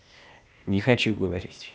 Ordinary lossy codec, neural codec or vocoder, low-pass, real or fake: none; codec, 16 kHz, 0.8 kbps, ZipCodec; none; fake